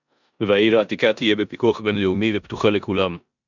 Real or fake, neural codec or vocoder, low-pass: fake; codec, 16 kHz in and 24 kHz out, 0.9 kbps, LongCat-Audio-Codec, four codebook decoder; 7.2 kHz